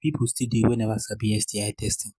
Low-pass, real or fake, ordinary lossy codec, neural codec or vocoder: none; real; none; none